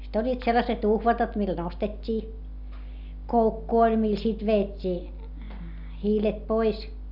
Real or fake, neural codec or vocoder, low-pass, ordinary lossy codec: real; none; 5.4 kHz; none